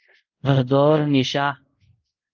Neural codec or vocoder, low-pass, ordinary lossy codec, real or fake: codec, 24 kHz, 0.5 kbps, DualCodec; 7.2 kHz; Opus, 24 kbps; fake